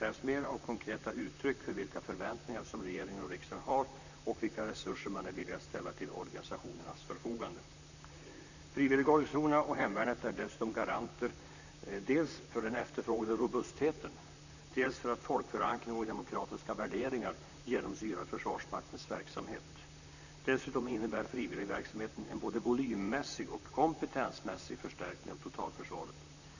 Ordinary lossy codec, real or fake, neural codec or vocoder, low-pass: none; fake; vocoder, 44.1 kHz, 128 mel bands, Pupu-Vocoder; 7.2 kHz